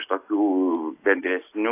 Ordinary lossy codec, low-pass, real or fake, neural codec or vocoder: AAC, 24 kbps; 3.6 kHz; real; none